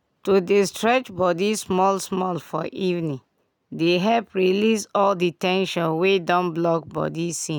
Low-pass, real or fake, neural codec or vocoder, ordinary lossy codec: 19.8 kHz; real; none; none